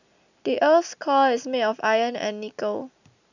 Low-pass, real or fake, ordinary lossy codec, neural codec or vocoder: 7.2 kHz; real; none; none